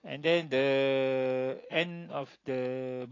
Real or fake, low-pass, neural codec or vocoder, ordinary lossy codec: real; 7.2 kHz; none; AAC, 32 kbps